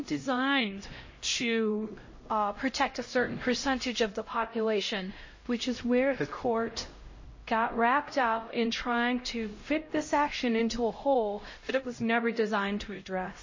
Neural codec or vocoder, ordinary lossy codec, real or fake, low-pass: codec, 16 kHz, 0.5 kbps, X-Codec, HuBERT features, trained on LibriSpeech; MP3, 32 kbps; fake; 7.2 kHz